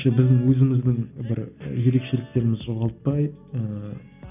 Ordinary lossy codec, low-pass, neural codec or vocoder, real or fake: none; 3.6 kHz; none; real